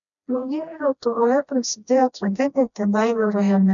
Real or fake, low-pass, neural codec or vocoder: fake; 7.2 kHz; codec, 16 kHz, 1 kbps, FreqCodec, smaller model